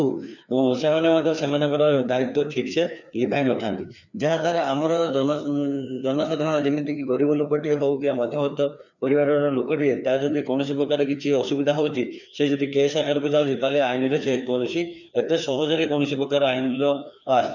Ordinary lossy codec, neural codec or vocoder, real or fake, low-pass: none; codec, 16 kHz, 2 kbps, FreqCodec, larger model; fake; 7.2 kHz